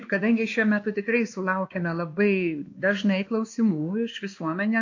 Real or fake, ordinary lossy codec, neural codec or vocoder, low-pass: fake; AAC, 48 kbps; codec, 16 kHz, 2 kbps, X-Codec, WavLM features, trained on Multilingual LibriSpeech; 7.2 kHz